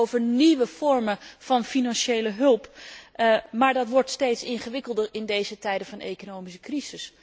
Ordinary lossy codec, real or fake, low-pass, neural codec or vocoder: none; real; none; none